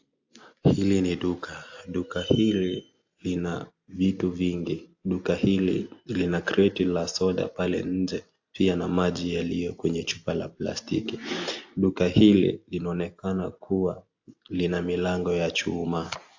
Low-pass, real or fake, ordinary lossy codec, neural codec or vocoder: 7.2 kHz; real; AAC, 48 kbps; none